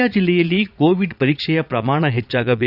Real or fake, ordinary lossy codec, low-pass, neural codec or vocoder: fake; none; 5.4 kHz; vocoder, 22.05 kHz, 80 mel bands, Vocos